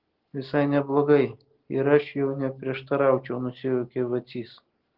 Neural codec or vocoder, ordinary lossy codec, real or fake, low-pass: none; Opus, 16 kbps; real; 5.4 kHz